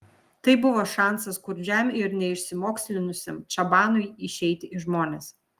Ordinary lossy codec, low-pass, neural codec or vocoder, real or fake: Opus, 32 kbps; 14.4 kHz; none; real